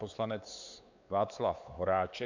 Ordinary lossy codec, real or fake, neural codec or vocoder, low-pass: MP3, 64 kbps; fake; codec, 16 kHz, 4 kbps, X-Codec, WavLM features, trained on Multilingual LibriSpeech; 7.2 kHz